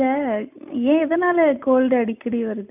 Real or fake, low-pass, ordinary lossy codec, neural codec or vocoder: real; 3.6 kHz; none; none